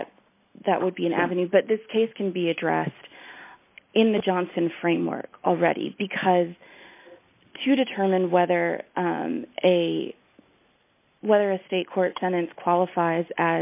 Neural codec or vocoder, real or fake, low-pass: none; real; 3.6 kHz